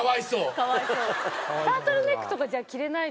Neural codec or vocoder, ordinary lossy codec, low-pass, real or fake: none; none; none; real